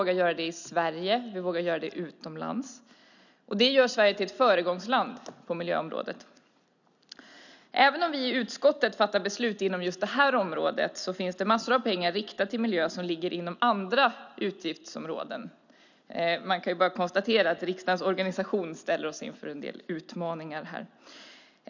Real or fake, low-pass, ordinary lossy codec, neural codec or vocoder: real; 7.2 kHz; none; none